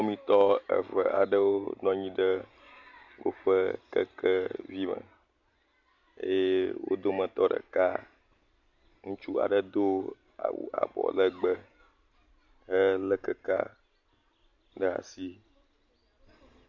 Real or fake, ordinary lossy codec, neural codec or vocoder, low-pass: real; MP3, 48 kbps; none; 7.2 kHz